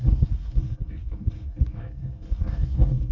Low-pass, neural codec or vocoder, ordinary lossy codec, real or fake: 7.2 kHz; codec, 24 kHz, 1 kbps, SNAC; none; fake